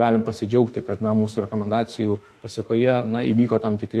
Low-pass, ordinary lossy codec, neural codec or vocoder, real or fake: 14.4 kHz; AAC, 64 kbps; autoencoder, 48 kHz, 32 numbers a frame, DAC-VAE, trained on Japanese speech; fake